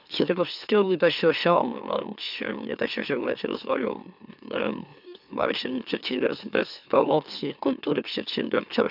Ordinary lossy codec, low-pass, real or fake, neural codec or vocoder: none; 5.4 kHz; fake; autoencoder, 44.1 kHz, a latent of 192 numbers a frame, MeloTTS